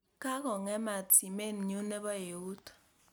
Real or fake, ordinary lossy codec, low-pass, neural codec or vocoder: real; none; none; none